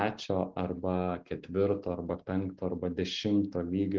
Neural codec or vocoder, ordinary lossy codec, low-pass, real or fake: none; Opus, 16 kbps; 7.2 kHz; real